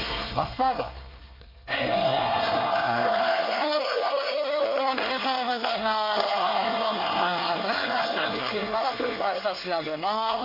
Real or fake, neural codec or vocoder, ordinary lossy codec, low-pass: fake; codec, 24 kHz, 1 kbps, SNAC; MP3, 32 kbps; 5.4 kHz